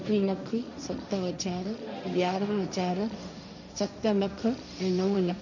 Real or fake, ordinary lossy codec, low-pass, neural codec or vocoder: fake; none; 7.2 kHz; codec, 16 kHz, 1.1 kbps, Voila-Tokenizer